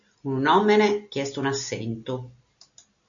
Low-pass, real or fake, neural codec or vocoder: 7.2 kHz; real; none